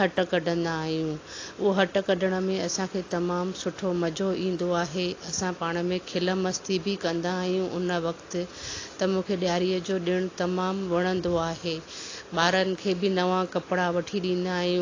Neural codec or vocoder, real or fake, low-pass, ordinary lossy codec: none; real; 7.2 kHz; AAC, 32 kbps